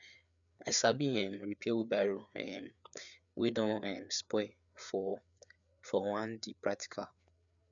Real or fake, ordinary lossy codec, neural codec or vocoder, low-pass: fake; MP3, 96 kbps; codec, 16 kHz, 4 kbps, FreqCodec, larger model; 7.2 kHz